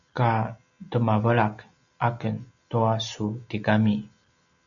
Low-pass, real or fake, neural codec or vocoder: 7.2 kHz; real; none